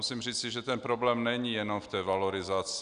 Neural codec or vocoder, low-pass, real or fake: none; 9.9 kHz; real